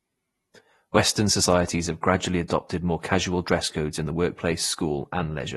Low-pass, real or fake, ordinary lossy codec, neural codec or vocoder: 19.8 kHz; fake; AAC, 32 kbps; vocoder, 48 kHz, 128 mel bands, Vocos